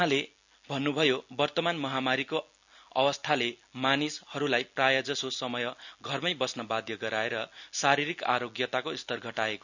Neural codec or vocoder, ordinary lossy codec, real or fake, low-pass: none; none; real; 7.2 kHz